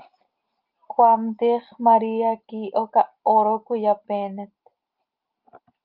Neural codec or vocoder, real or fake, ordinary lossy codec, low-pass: none; real; Opus, 24 kbps; 5.4 kHz